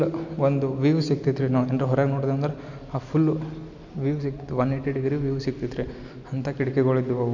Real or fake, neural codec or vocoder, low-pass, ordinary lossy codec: real; none; 7.2 kHz; none